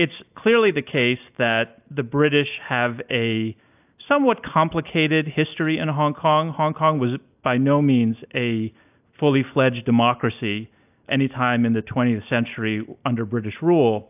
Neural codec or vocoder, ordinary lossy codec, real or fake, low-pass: none; AAC, 32 kbps; real; 3.6 kHz